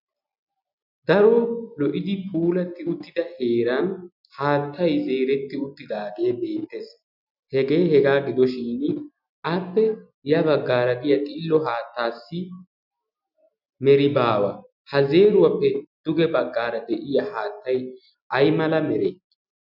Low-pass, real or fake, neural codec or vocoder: 5.4 kHz; real; none